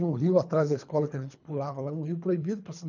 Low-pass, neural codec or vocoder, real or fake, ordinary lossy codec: 7.2 kHz; codec, 24 kHz, 3 kbps, HILCodec; fake; none